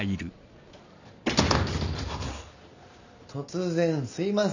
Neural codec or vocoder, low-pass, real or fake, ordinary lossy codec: none; 7.2 kHz; real; none